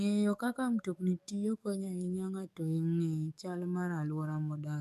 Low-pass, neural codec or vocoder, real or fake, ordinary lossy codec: 14.4 kHz; codec, 44.1 kHz, 7.8 kbps, DAC; fake; none